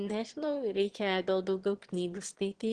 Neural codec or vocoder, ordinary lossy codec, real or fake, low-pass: autoencoder, 22.05 kHz, a latent of 192 numbers a frame, VITS, trained on one speaker; Opus, 16 kbps; fake; 9.9 kHz